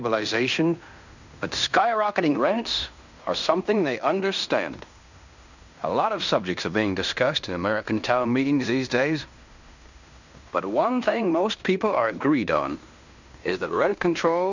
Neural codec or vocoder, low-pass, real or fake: codec, 16 kHz in and 24 kHz out, 0.9 kbps, LongCat-Audio-Codec, fine tuned four codebook decoder; 7.2 kHz; fake